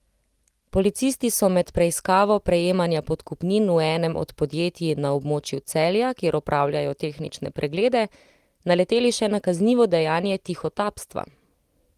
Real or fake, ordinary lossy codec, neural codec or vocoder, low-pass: real; Opus, 24 kbps; none; 14.4 kHz